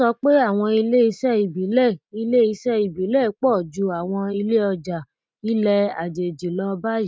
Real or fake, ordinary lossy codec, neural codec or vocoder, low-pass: real; none; none; none